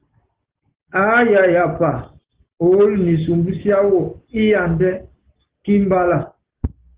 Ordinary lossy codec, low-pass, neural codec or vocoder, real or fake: Opus, 16 kbps; 3.6 kHz; none; real